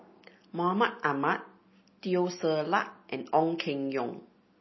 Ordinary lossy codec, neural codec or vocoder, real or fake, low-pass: MP3, 24 kbps; none; real; 7.2 kHz